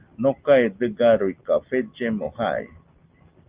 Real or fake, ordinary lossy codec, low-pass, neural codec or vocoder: real; Opus, 24 kbps; 3.6 kHz; none